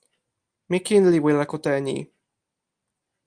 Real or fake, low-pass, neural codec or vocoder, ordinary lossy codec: real; 9.9 kHz; none; Opus, 32 kbps